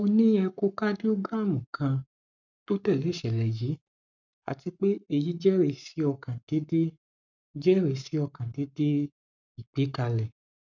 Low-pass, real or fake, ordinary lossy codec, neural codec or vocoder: 7.2 kHz; fake; none; codec, 44.1 kHz, 7.8 kbps, Pupu-Codec